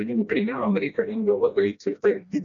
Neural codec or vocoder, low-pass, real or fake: codec, 16 kHz, 1 kbps, FreqCodec, smaller model; 7.2 kHz; fake